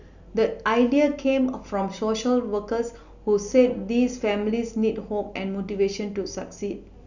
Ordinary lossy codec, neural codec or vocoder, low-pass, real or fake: none; none; 7.2 kHz; real